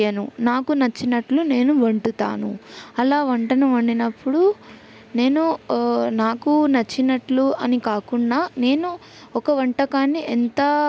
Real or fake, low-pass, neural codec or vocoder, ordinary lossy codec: real; none; none; none